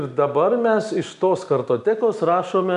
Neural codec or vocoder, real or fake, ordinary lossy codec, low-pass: none; real; AAC, 96 kbps; 10.8 kHz